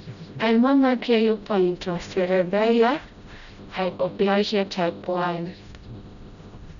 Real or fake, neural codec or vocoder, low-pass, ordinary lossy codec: fake; codec, 16 kHz, 0.5 kbps, FreqCodec, smaller model; 7.2 kHz; none